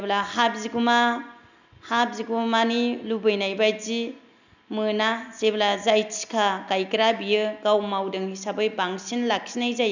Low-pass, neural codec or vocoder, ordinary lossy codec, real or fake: 7.2 kHz; none; none; real